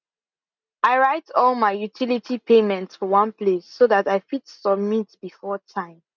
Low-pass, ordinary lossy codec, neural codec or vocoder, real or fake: 7.2 kHz; none; none; real